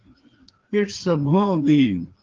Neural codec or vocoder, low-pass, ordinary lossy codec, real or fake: codec, 16 kHz, 2 kbps, FreqCodec, larger model; 7.2 kHz; Opus, 16 kbps; fake